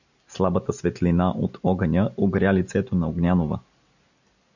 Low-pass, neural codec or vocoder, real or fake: 7.2 kHz; none; real